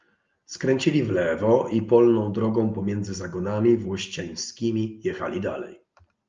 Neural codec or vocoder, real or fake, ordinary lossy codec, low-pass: none; real; Opus, 24 kbps; 7.2 kHz